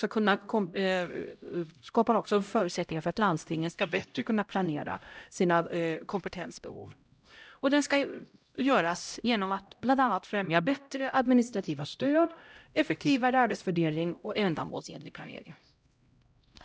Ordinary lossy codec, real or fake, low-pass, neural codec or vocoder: none; fake; none; codec, 16 kHz, 0.5 kbps, X-Codec, HuBERT features, trained on LibriSpeech